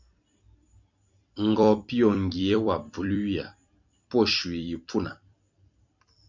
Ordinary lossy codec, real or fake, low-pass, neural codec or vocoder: MP3, 64 kbps; fake; 7.2 kHz; vocoder, 44.1 kHz, 128 mel bands every 256 samples, BigVGAN v2